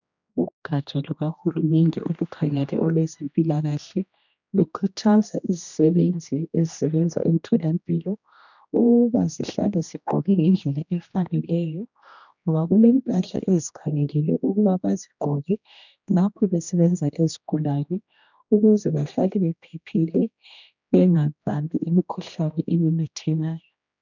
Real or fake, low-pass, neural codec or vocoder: fake; 7.2 kHz; codec, 16 kHz, 1 kbps, X-Codec, HuBERT features, trained on general audio